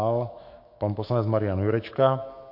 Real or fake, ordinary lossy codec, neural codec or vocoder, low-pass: fake; MP3, 32 kbps; autoencoder, 48 kHz, 128 numbers a frame, DAC-VAE, trained on Japanese speech; 5.4 kHz